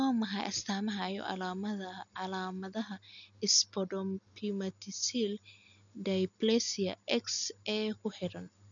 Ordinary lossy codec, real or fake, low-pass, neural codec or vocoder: none; real; 7.2 kHz; none